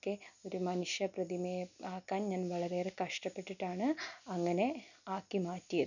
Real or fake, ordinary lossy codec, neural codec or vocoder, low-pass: real; none; none; 7.2 kHz